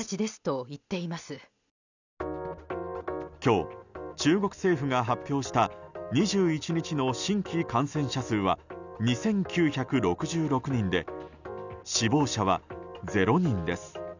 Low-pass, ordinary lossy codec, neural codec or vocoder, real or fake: 7.2 kHz; none; none; real